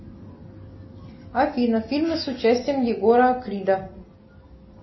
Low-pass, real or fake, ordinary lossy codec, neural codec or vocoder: 7.2 kHz; real; MP3, 24 kbps; none